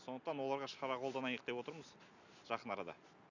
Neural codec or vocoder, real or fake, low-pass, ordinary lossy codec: none; real; 7.2 kHz; none